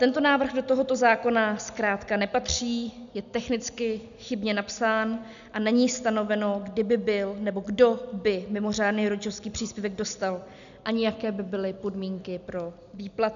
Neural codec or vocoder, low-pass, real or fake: none; 7.2 kHz; real